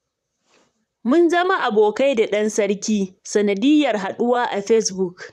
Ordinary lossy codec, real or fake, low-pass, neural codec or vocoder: none; fake; 14.4 kHz; vocoder, 44.1 kHz, 128 mel bands, Pupu-Vocoder